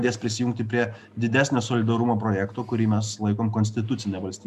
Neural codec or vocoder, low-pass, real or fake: none; 14.4 kHz; real